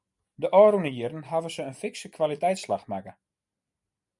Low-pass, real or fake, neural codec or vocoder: 10.8 kHz; real; none